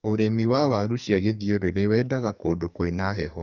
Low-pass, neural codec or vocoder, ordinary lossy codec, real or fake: 7.2 kHz; codec, 44.1 kHz, 2.6 kbps, SNAC; Opus, 64 kbps; fake